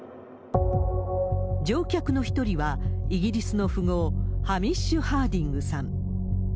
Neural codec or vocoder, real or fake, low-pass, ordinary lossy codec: none; real; none; none